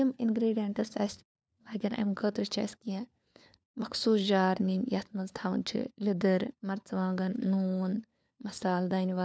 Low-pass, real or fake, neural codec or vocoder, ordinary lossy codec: none; fake; codec, 16 kHz, 4 kbps, FunCodec, trained on LibriTTS, 50 frames a second; none